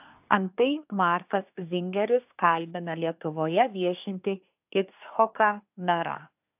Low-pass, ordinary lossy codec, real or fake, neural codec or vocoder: 3.6 kHz; AAC, 32 kbps; fake; codec, 24 kHz, 1 kbps, SNAC